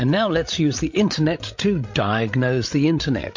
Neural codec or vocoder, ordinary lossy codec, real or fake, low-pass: codec, 16 kHz, 16 kbps, FreqCodec, larger model; MP3, 48 kbps; fake; 7.2 kHz